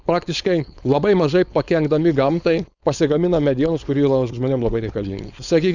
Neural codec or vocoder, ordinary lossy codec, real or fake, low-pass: codec, 16 kHz, 4.8 kbps, FACodec; Opus, 64 kbps; fake; 7.2 kHz